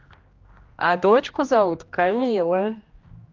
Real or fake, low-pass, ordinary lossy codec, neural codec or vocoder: fake; 7.2 kHz; Opus, 24 kbps; codec, 16 kHz, 1 kbps, X-Codec, HuBERT features, trained on general audio